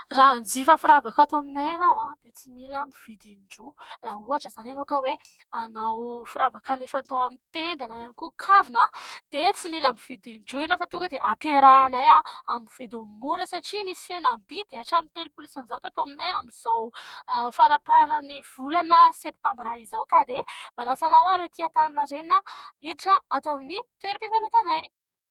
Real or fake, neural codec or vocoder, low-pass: fake; codec, 44.1 kHz, 2.6 kbps, DAC; 19.8 kHz